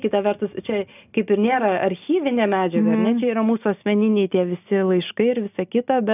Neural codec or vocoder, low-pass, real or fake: none; 3.6 kHz; real